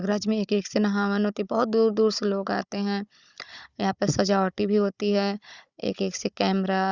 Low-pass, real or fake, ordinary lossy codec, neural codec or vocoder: 7.2 kHz; fake; Opus, 64 kbps; codec, 16 kHz, 16 kbps, FunCodec, trained on Chinese and English, 50 frames a second